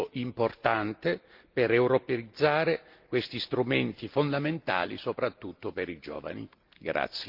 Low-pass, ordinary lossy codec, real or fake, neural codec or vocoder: 5.4 kHz; Opus, 32 kbps; real; none